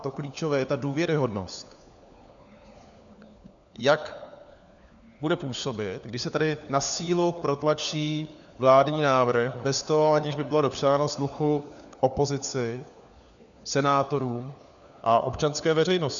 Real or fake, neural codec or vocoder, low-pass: fake; codec, 16 kHz, 4 kbps, FunCodec, trained on LibriTTS, 50 frames a second; 7.2 kHz